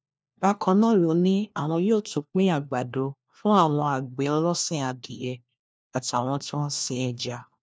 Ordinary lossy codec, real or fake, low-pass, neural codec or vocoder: none; fake; none; codec, 16 kHz, 1 kbps, FunCodec, trained on LibriTTS, 50 frames a second